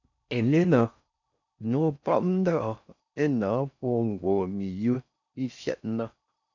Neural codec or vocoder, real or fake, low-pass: codec, 16 kHz in and 24 kHz out, 0.6 kbps, FocalCodec, streaming, 4096 codes; fake; 7.2 kHz